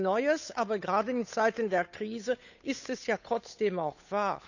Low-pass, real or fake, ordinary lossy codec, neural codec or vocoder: 7.2 kHz; fake; none; codec, 16 kHz, 8 kbps, FunCodec, trained on Chinese and English, 25 frames a second